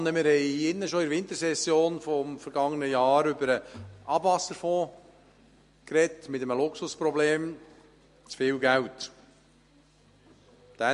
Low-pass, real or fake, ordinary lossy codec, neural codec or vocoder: 14.4 kHz; real; MP3, 48 kbps; none